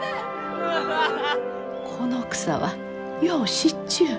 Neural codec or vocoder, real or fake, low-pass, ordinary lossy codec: none; real; none; none